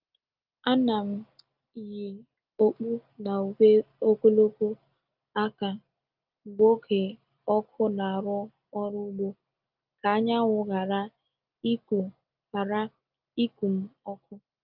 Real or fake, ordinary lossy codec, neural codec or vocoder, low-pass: real; Opus, 24 kbps; none; 5.4 kHz